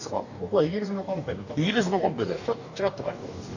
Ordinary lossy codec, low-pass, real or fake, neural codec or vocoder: none; 7.2 kHz; fake; codec, 44.1 kHz, 2.6 kbps, DAC